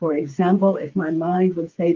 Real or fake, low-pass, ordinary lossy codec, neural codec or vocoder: fake; 7.2 kHz; Opus, 32 kbps; codec, 16 kHz, 4 kbps, FreqCodec, smaller model